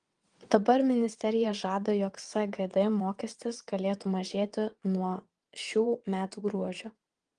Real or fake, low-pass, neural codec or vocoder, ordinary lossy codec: fake; 9.9 kHz; vocoder, 22.05 kHz, 80 mel bands, WaveNeXt; Opus, 24 kbps